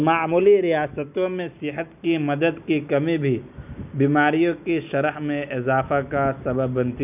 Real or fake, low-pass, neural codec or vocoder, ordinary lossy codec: real; 3.6 kHz; none; none